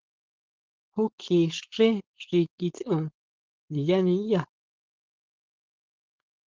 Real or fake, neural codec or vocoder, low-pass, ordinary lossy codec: fake; codec, 16 kHz, 4.8 kbps, FACodec; 7.2 kHz; Opus, 16 kbps